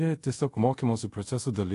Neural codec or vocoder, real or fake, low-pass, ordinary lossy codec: codec, 24 kHz, 0.5 kbps, DualCodec; fake; 10.8 kHz; AAC, 48 kbps